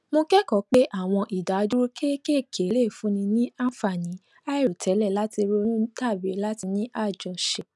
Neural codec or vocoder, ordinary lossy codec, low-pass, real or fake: none; none; none; real